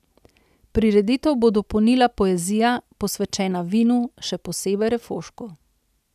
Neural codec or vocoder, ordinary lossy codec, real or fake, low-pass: none; none; real; 14.4 kHz